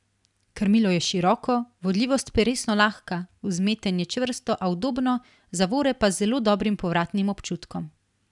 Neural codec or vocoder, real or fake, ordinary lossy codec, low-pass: none; real; none; 10.8 kHz